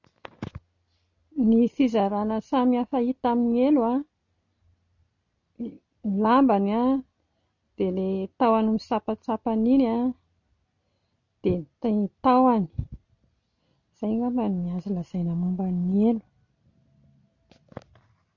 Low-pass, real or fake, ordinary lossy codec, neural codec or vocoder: 7.2 kHz; real; none; none